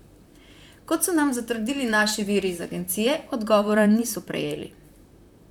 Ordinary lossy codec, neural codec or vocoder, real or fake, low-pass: none; vocoder, 44.1 kHz, 128 mel bands, Pupu-Vocoder; fake; 19.8 kHz